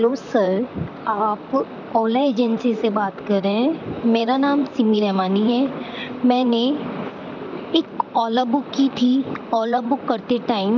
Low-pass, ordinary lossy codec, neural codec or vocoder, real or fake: 7.2 kHz; none; vocoder, 44.1 kHz, 128 mel bands, Pupu-Vocoder; fake